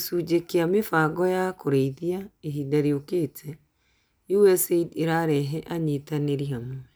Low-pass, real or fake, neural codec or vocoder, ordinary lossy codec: none; real; none; none